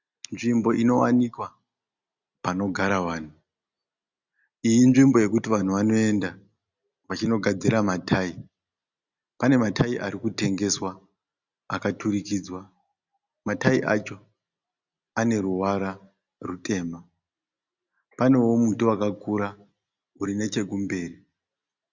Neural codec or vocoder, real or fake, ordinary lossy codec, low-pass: none; real; Opus, 64 kbps; 7.2 kHz